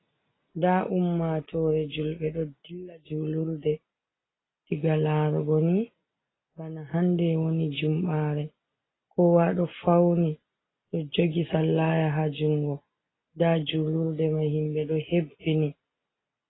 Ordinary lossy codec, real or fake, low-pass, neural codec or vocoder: AAC, 16 kbps; real; 7.2 kHz; none